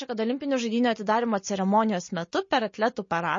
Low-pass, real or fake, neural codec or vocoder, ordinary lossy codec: 7.2 kHz; real; none; MP3, 32 kbps